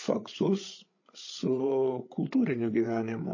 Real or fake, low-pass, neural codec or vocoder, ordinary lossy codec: fake; 7.2 kHz; codec, 16 kHz, 8 kbps, FreqCodec, larger model; MP3, 32 kbps